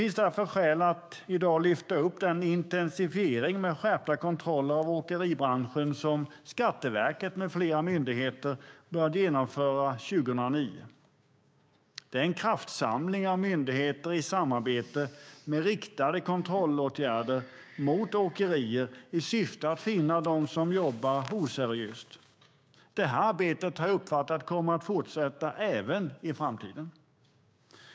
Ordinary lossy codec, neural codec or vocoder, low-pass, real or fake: none; codec, 16 kHz, 6 kbps, DAC; none; fake